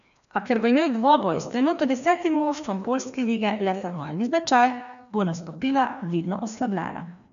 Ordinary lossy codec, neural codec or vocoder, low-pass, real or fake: MP3, 96 kbps; codec, 16 kHz, 1 kbps, FreqCodec, larger model; 7.2 kHz; fake